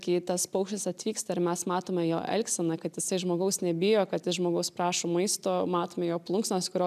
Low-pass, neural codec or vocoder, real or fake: 14.4 kHz; none; real